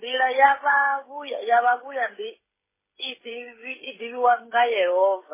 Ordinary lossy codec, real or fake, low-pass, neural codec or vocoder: MP3, 16 kbps; fake; 3.6 kHz; codec, 16 kHz, 16 kbps, FreqCodec, smaller model